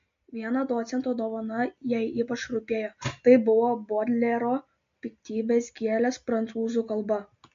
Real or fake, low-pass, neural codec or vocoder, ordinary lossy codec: real; 7.2 kHz; none; MP3, 48 kbps